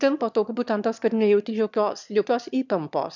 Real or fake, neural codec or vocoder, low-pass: fake; autoencoder, 22.05 kHz, a latent of 192 numbers a frame, VITS, trained on one speaker; 7.2 kHz